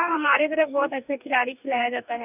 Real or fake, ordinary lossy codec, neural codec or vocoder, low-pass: fake; none; codec, 44.1 kHz, 2.6 kbps, DAC; 3.6 kHz